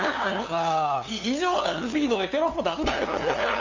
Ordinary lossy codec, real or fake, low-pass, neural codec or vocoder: Opus, 64 kbps; fake; 7.2 kHz; codec, 16 kHz, 2 kbps, FunCodec, trained on LibriTTS, 25 frames a second